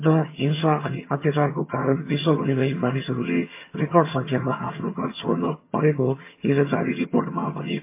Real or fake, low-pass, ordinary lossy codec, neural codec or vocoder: fake; 3.6 kHz; MP3, 24 kbps; vocoder, 22.05 kHz, 80 mel bands, HiFi-GAN